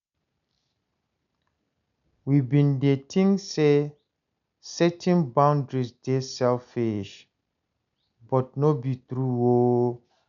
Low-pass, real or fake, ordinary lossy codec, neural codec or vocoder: 7.2 kHz; real; none; none